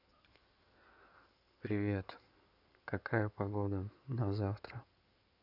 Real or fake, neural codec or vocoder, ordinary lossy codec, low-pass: fake; codec, 16 kHz in and 24 kHz out, 2.2 kbps, FireRedTTS-2 codec; none; 5.4 kHz